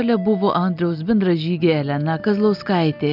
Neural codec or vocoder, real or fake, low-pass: none; real; 5.4 kHz